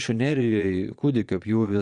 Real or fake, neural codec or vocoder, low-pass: fake; vocoder, 22.05 kHz, 80 mel bands, Vocos; 9.9 kHz